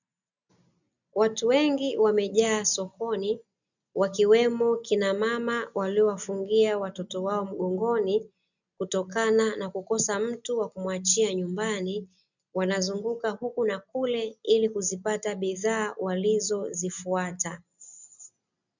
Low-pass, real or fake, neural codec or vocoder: 7.2 kHz; real; none